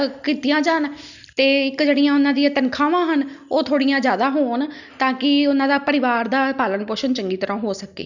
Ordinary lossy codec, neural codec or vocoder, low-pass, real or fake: none; none; 7.2 kHz; real